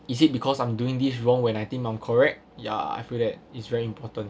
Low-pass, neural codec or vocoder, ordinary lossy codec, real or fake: none; none; none; real